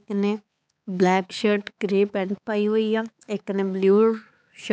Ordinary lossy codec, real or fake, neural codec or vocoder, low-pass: none; fake; codec, 16 kHz, 4 kbps, X-Codec, HuBERT features, trained on balanced general audio; none